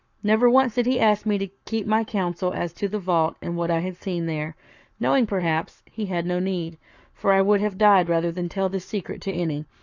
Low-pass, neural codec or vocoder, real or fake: 7.2 kHz; codec, 44.1 kHz, 7.8 kbps, Pupu-Codec; fake